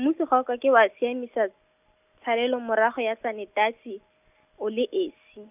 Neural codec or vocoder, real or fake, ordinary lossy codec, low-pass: none; real; none; 3.6 kHz